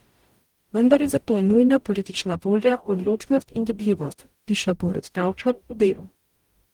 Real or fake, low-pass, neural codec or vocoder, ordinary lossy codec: fake; 19.8 kHz; codec, 44.1 kHz, 0.9 kbps, DAC; Opus, 24 kbps